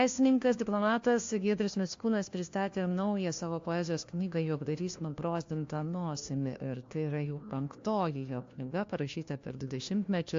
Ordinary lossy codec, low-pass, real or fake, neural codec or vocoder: AAC, 48 kbps; 7.2 kHz; fake; codec, 16 kHz, 1 kbps, FunCodec, trained on LibriTTS, 50 frames a second